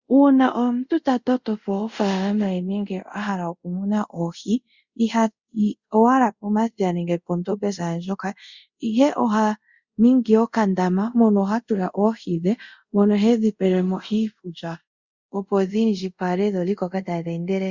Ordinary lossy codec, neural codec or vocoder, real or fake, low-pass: Opus, 64 kbps; codec, 24 kHz, 0.5 kbps, DualCodec; fake; 7.2 kHz